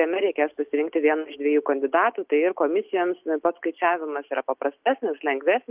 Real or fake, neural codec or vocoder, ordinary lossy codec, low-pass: real; none; Opus, 24 kbps; 3.6 kHz